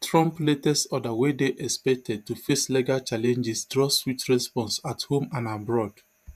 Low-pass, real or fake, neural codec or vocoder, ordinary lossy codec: 14.4 kHz; fake; vocoder, 48 kHz, 128 mel bands, Vocos; none